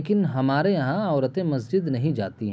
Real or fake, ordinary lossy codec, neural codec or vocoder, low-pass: real; none; none; none